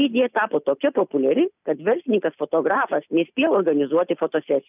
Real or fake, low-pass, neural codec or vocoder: real; 3.6 kHz; none